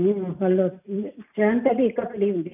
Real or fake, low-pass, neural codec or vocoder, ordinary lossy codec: real; 3.6 kHz; none; none